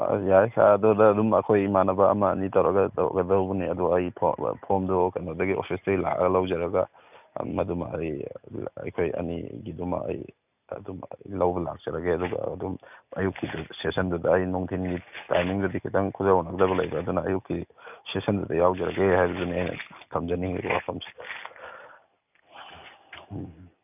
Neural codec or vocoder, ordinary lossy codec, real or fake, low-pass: none; none; real; 3.6 kHz